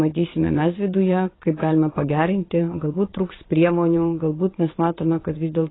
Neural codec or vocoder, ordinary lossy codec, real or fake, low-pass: vocoder, 22.05 kHz, 80 mel bands, WaveNeXt; AAC, 16 kbps; fake; 7.2 kHz